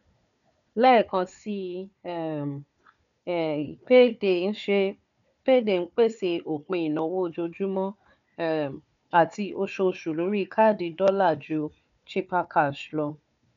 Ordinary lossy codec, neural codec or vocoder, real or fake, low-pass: none; codec, 16 kHz, 4 kbps, FunCodec, trained on Chinese and English, 50 frames a second; fake; 7.2 kHz